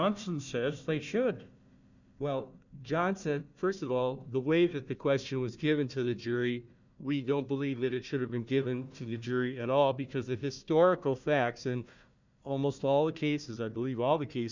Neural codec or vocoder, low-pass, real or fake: codec, 16 kHz, 1 kbps, FunCodec, trained on Chinese and English, 50 frames a second; 7.2 kHz; fake